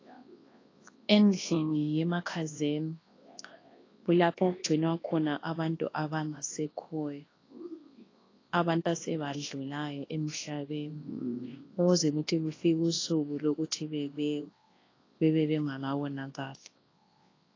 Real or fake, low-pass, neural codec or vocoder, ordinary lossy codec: fake; 7.2 kHz; codec, 24 kHz, 0.9 kbps, WavTokenizer, large speech release; AAC, 32 kbps